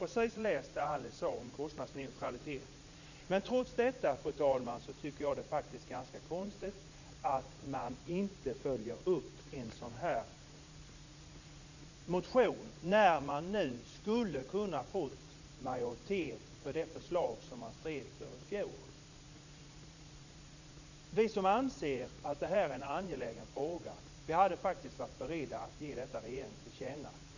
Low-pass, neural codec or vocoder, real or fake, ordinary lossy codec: 7.2 kHz; vocoder, 44.1 kHz, 80 mel bands, Vocos; fake; none